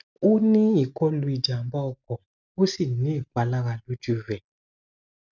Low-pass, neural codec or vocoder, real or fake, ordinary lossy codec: 7.2 kHz; none; real; none